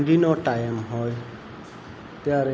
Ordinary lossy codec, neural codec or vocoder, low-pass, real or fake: none; none; none; real